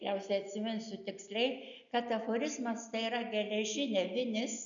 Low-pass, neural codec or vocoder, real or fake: 7.2 kHz; none; real